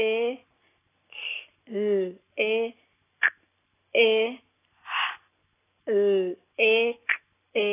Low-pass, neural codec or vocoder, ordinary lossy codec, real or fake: 3.6 kHz; none; AAC, 16 kbps; real